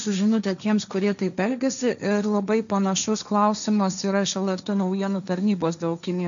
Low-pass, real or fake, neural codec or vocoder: 7.2 kHz; fake; codec, 16 kHz, 1.1 kbps, Voila-Tokenizer